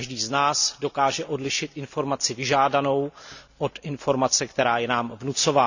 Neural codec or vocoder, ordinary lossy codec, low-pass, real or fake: none; none; 7.2 kHz; real